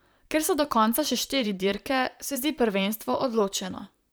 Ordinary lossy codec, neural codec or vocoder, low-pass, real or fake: none; vocoder, 44.1 kHz, 128 mel bands, Pupu-Vocoder; none; fake